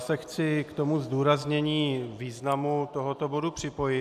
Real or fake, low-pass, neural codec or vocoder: real; 14.4 kHz; none